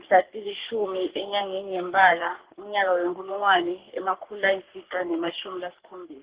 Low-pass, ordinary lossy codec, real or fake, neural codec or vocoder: 3.6 kHz; Opus, 16 kbps; fake; codec, 44.1 kHz, 3.4 kbps, Pupu-Codec